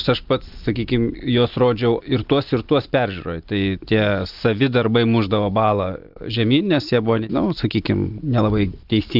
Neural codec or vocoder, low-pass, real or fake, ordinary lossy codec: none; 5.4 kHz; real; Opus, 32 kbps